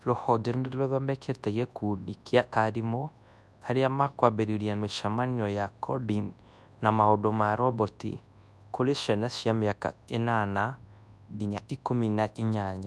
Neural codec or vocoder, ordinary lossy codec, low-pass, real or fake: codec, 24 kHz, 0.9 kbps, WavTokenizer, large speech release; none; none; fake